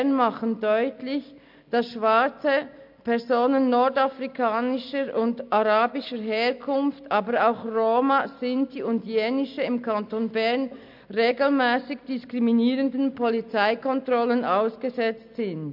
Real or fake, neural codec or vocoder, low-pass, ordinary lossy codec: real; none; 5.4 kHz; MP3, 48 kbps